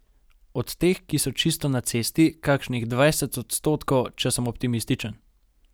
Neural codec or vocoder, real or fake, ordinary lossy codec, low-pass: none; real; none; none